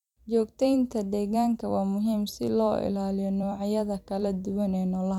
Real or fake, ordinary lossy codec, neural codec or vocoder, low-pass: fake; MP3, 96 kbps; vocoder, 44.1 kHz, 128 mel bands every 256 samples, BigVGAN v2; 19.8 kHz